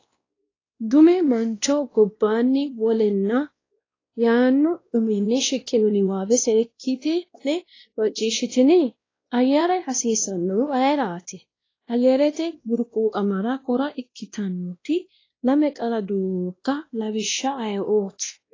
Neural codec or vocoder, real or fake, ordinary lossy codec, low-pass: codec, 16 kHz, 1 kbps, X-Codec, WavLM features, trained on Multilingual LibriSpeech; fake; AAC, 32 kbps; 7.2 kHz